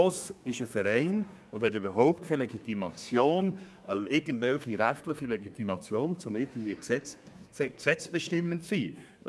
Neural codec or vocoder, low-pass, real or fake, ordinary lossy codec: codec, 24 kHz, 1 kbps, SNAC; none; fake; none